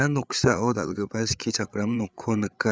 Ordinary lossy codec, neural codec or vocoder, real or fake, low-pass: none; codec, 16 kHz, 8 kbps, FreqCodec, larger model; fake; none